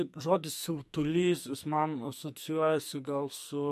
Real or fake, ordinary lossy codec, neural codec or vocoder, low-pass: fake; MP3, 64 kbps; codec, 44.1 kHz, 2.6 kbps, SNAC; 14.4 kHz